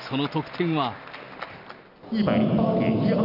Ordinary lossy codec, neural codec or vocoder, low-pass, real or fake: none; none; 5.4 kHz; real